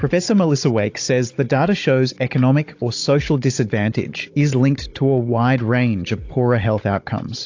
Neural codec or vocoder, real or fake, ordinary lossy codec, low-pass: codec, 16 kHz, 16 kbps, FunCodec, trained on Chinese and English, 50 frames a second; fake; AAC, 48 kbps; 7.2 kHz